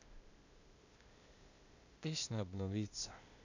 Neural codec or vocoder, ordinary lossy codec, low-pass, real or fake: codec, 16 kHz, 0.8 kbps, ZipCodec; none; 7.2 kHz; fake